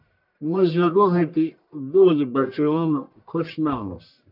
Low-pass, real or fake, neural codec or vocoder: 5.4 kHz; fake; codec, 44.1 kHz, 1.7 kbps, Pupu-Codec